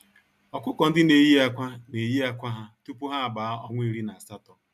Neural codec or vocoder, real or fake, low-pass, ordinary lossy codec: none; real; 14.4 kHz; MP3, 96 kbps